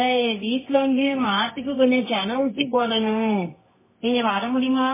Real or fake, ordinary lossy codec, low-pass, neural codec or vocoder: fake; MP3, 16 kbps; 3.6 kHz; codec, 24 kHz, 0.9 kbps, WavTokenizer, medium music audio release